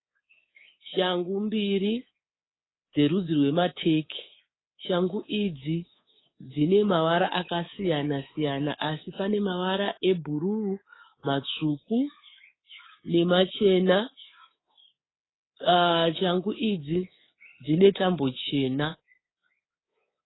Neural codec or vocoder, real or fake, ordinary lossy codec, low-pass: codec, 24 kHz, 3.1 kbps, DualCodec; fake; AAC, 16 kbps; 7.2 kHz